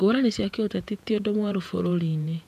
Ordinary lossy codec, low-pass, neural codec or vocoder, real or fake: none; 14.4 kHz; vocoder, 48 kHz, 128 mel bands, Vocos; fake